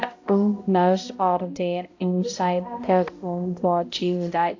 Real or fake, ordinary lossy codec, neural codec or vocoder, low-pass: fake; AAC, 48 kbps; codec, 16 kHz, 0.5 kbps, X-Codec, HuBERT features, trained on balanced general audio; 7.2 kHz